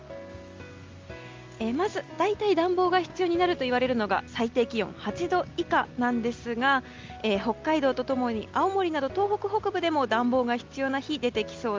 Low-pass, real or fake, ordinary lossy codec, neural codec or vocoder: 7.2 kHz; real; Opus, 32 kbps; none